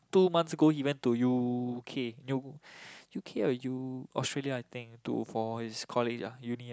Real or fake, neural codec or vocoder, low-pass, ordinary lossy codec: real; none; none; none